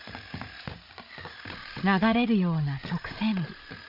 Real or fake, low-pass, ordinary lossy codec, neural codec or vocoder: fake; 5.4 kHz; none; codec, 16 kHz, 8 kbps, FreqCodec, larger model